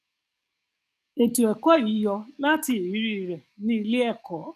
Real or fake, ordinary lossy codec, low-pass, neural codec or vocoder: fake; none; 14.4 kHz; codec, 44.1 kHz, 7.8 kbps, DAC